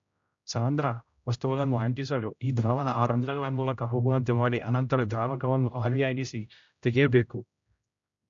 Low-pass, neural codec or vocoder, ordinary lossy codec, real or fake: 7.2 kHz; codec, 16 kHz, 0.5 kbps, X-Codec, HuBERT features, trained on general audio; AAC, 64 kbps; fake